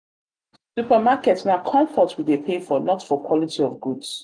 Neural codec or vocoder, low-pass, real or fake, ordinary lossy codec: none; 9.9 kHz; real; none